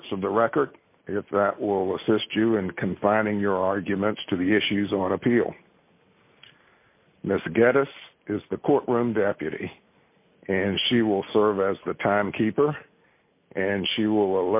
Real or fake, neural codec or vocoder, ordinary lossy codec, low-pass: real; none; MP3, 32 kbps; 3.6 kHz